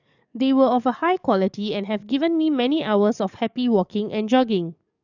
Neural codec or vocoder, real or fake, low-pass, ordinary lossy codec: codec, 44.1 kHz, 7.8 kbps, DAC; fake; 7.2 kHz; none